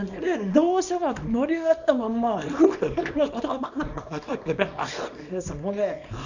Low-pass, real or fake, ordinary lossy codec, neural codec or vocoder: 7.2 kHz; fake; none; codec, 24 kHz, 0.9 kbps, WavTokenizer, small release